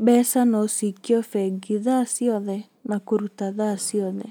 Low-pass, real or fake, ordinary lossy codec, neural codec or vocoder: none; real; none; none